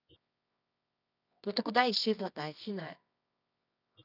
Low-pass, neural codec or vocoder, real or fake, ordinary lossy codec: 5.4 kHz; codec, 24 kHz, 0.9 kbps, WavTokenizer, medium music audio release; fake; none